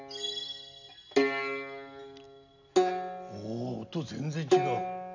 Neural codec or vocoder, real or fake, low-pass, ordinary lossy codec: none; real; 7.2 kHz; none